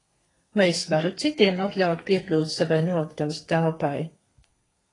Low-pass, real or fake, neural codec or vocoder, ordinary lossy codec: 10.8 kHz; fake; codec, 32 kHz, 1.9 kbps, SNAC; AAC, 32 kbps